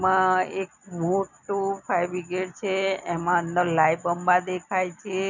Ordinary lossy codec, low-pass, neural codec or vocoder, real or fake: none; 7.2 kHz; none; real